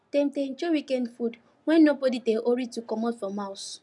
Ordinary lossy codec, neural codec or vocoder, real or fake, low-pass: none; none; real; 10.8 kHz